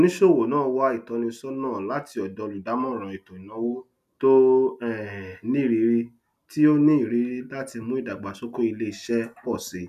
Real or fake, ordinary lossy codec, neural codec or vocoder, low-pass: real; none; none; 14.4 kHz